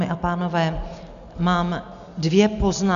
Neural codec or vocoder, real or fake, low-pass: none; real; 7.2 kHz